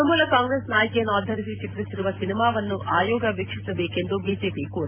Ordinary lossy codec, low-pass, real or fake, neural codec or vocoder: AAC, 32 kbps; 3.6 kHz; real; none